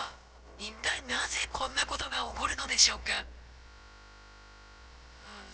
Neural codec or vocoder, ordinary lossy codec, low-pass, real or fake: codec, 16 kHz, about 1 kbps, DyCAST, with the encoder's durations; none; none; fake